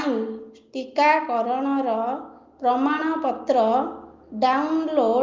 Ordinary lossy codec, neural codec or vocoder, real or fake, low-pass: Opus, 32 kbps; none; real; 7.2 kHz